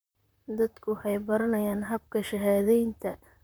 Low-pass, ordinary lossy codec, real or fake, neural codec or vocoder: none; none; fake; vocoder, 44.1 kHz, 128 mel bands, Pupu-Vocoder